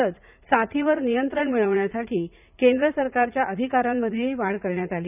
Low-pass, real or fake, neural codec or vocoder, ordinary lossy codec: 3.6 kHz; fake; vocoder, 44.1 kHz, 80 mel bands, Vocos; none